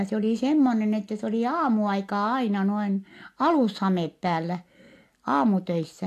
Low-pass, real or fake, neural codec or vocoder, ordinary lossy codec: 14.4 kHz; real; none; AAC, 64 kbps